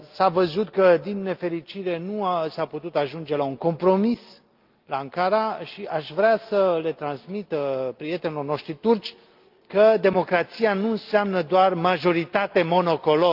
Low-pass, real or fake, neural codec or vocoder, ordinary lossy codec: 5.4 kHz; real; none; Opus, 32 kbps